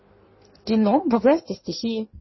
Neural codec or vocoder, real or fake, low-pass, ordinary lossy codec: codec, 16 kHz in and 24 kHz out, 1.1 kbps, FireRedTTS-2 codec; fake; 7.2 kHz; MP3, 24 kbps